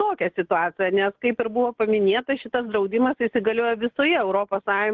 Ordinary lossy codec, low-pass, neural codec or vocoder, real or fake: Opus, 16 kbps; 7.2 kHz; none; real